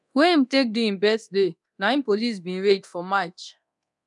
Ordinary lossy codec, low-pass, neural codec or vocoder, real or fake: none; 10.8 kHz; codec, 24 kHz, 0.9 kbps, DualCodec; fake